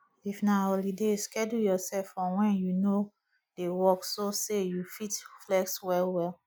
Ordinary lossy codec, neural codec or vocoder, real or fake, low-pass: none; none; real; none